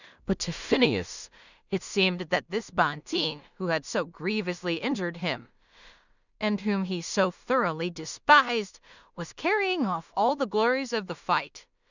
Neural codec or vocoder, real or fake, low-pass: codec, 16 kHz in and 24 kHz out, 0.4 kbps, LongCat-Audio-Codec, two codebook decoder; fake; 7.2 kHz